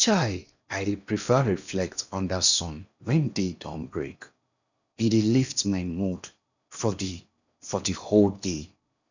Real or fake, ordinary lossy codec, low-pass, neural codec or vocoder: fake; none; 7.2 kHz; codec, 16 kHz in and 24 kHz out, 0.8 kbps, FocalCodec, streaming, 65536 codes